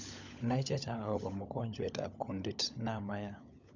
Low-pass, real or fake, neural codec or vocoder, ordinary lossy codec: none; fake; codec, 16 kHz, 16 kbps, FunCodec, trained on LibriTTS, 50 frames a second; none